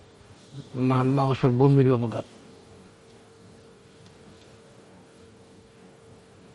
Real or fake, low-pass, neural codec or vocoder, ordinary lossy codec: fake; 19.8 kHz; codec, 44.1 kHz, 2.6 kbps, DAC; MP3, 48 kbps